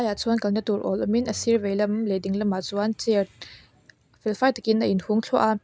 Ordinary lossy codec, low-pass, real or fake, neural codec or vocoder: none; none; real; none